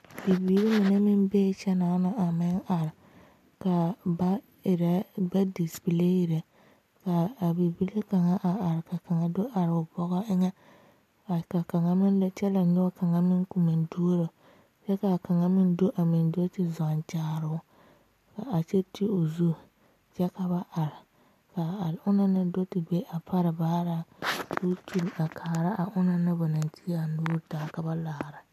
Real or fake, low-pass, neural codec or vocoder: real; 14.4 kHz; none